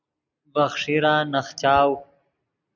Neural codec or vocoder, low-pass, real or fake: none; 7.2 kHz; real